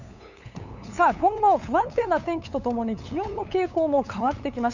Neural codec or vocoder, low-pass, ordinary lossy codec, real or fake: codec, 16 kHz, 8 kbps, FunCodec, trained on LibriTTS, 25 frames a second; 7.2 kHz; none; fake